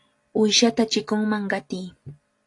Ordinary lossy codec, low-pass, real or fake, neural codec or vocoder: AAC, 48 kbps; 10.8 kHz; real; none